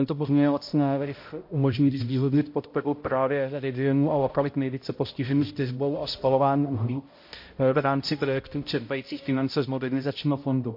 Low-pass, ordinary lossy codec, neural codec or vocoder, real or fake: 5.4 kHz; MP3, 32 kbps; codec, 16 kHz, 0.5 kbps, X-Codec, HuBERT features, trained on balanced general audio; fake